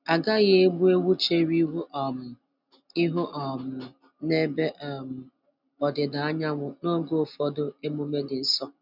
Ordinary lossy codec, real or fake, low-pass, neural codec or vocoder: none; real; 5.4 kHz; none